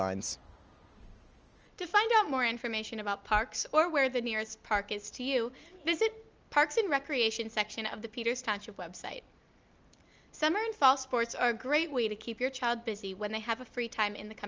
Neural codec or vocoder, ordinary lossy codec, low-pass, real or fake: none; Opus, 24 kbps; 7.2 kHz; real